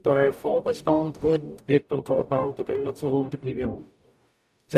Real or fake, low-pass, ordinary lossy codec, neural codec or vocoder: fake; 14.4 kHz; none; codec, 44.1 kHz, 0.9 kbps, DAC